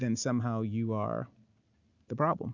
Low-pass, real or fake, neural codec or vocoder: 7.2 kHz; real; none